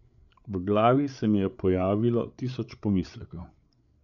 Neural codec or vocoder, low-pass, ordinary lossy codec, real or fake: codec, 16 kHz, 8 kbps, FreqCodec, larger model; 7.2 kHz; none; fake